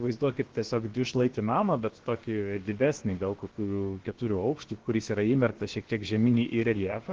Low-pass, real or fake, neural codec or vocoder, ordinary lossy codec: 7.2 kHz; fake; codec, 16 kHz, about 1 kbps, DyCAST, with the encoder's durations; Opus, 16 kbps